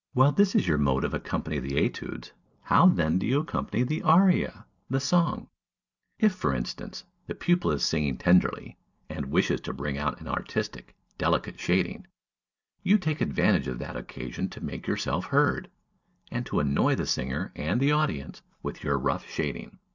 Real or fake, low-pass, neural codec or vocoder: real; 7.2 kHz; none